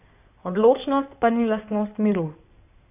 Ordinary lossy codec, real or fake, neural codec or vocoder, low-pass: none; fake; codec, 16 kHz in and 24 kHz out, 2.2 kbps, FireRedTTS-2 codec; 3.6 kHz